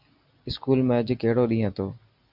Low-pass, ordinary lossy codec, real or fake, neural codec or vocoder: 5.4 kHz; MP3, 48 kbps; real; none